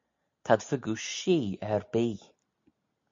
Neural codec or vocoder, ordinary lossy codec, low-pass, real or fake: none; MP3, 48 kbps; 7.2 kHz; real